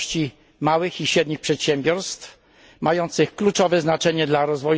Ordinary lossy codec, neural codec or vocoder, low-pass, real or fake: none; none; none; real